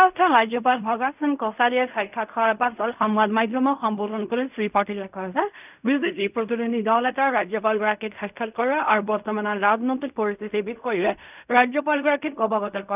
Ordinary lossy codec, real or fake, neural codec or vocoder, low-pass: none; fake; codec, 16 kHz in and 24 kHz out, 0.4 kbps, LongCat-Audio-Codec, fine tuned four codebook decoder; 3.6 kHz